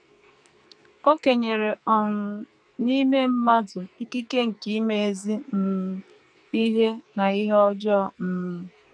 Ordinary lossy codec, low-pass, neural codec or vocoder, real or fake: none; 9.9 kHz; codec, 44.1 kHz, 2.6 kbps, SNAC; fake